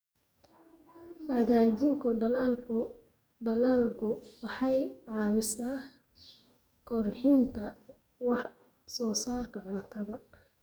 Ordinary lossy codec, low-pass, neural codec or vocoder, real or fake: none; none; codec, 44.1 kHz, 2.6 kbps, DAC; fake